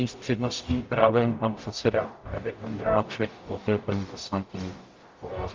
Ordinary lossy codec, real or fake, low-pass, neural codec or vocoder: Opus, 32 kbps; fake; 7.2 kHz; codec, 44.1 kHz, 0.9 kbps, DAC